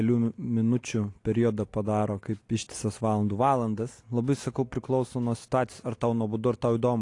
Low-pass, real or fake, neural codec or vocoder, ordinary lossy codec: 10.8 kHz; real; none; AAC, 48 kbps